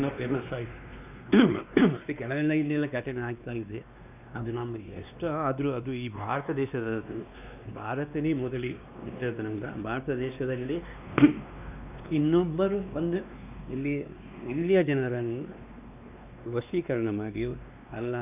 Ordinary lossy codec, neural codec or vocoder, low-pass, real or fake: none; codec, 16 kHz, 2 kbps, X-Codec, WavLM features, trained on Multilingual LibriSpeech; 3.6 kHz; fake